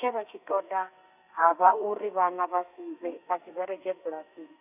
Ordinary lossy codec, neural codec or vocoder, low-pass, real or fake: none; codec, 32 kHz, 1.9 kbps, SNAC; 3.6 kHz; fake